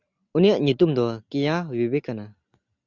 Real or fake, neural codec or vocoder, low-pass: real; none; 7.2 kHz